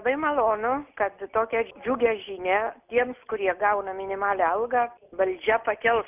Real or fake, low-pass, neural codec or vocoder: real; 3.6 kHz; none